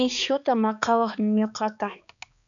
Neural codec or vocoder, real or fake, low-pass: codec, 16 kHz, 2 kbps, X-Codec, HuBERT features, trained on balanced general audio; fake; 7.2 kHz